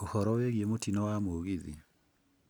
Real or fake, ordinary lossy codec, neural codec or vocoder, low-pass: real; none; none; none